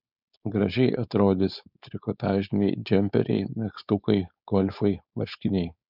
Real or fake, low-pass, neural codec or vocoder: fake; 5.4 kHz; codec, 16 kHz, 4.8 kbps, FACodec